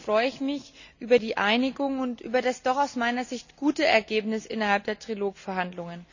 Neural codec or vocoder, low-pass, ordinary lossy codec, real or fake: none; 7.2 kHz; none; real